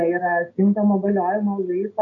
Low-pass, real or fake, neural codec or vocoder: 7.2 kHz; real; none